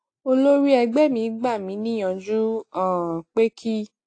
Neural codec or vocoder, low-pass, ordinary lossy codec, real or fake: none; 9.9 kHz; AAC, 48 kbps; real